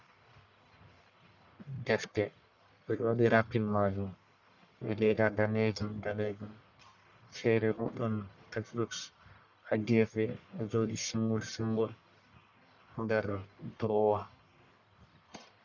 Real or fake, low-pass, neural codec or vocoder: fake; 7.2 kHz; codec, 44.1 kHz, 1.7 kbps, Pupu-Codec